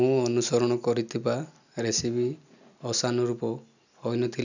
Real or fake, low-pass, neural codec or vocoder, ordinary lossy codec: real; 7.2 kHz; none; none